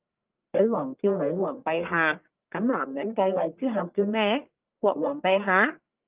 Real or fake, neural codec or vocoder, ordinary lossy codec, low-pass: fake; codec, 44.1 kHz, 1.7 kbps, Pupu-Codec; Opus, 32 kbps; 3.6 kHz